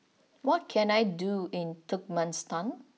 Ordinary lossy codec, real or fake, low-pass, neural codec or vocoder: none; real; none; none